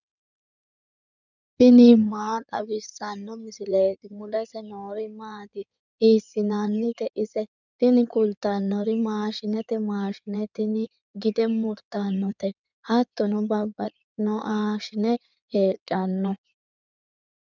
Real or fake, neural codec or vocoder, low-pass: fake; codec, 16 kHz in and 24 kHz out, 2.2 kbps, FireRedTTS-2 codec; 7.2 kHz